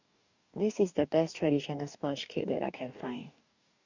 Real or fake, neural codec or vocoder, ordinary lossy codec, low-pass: fake; codec, 44.1 kHz, 2.6 kbps, DAC; none; 7.2 kHz